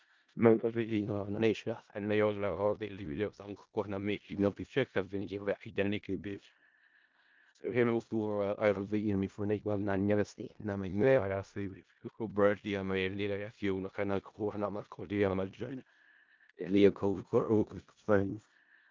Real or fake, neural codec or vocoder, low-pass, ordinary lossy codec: fake; codec, 16 kHz in and 24 kHz out, 0.4 kbps, LongCat-Audio-Codec, four codebook decoder; 7.2 kHz; Opus, 32 kbps